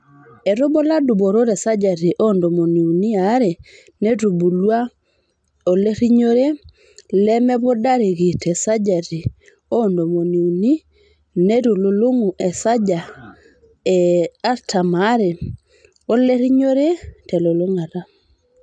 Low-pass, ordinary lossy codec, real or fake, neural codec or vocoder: 9.9 kHz; none; real; none